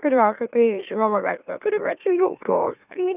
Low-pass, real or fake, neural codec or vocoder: 3.6 kHz; fake; autoencoder, 44.1 kHz, a latent of 192 numbers a frame, MeloTTS